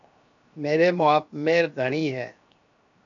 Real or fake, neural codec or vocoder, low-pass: fake; codec, 16 kHz, 0.7 kbps, FocalCodec; 7.2 kHz